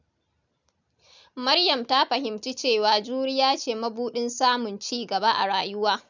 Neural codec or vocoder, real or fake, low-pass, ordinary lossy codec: none; real; 7.2 kHz; none